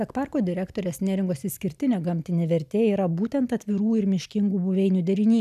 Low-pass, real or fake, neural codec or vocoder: 14.4 kHz; real; none